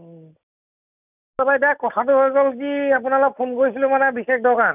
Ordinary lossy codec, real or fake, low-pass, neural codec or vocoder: none; real; 3.6 kHz; none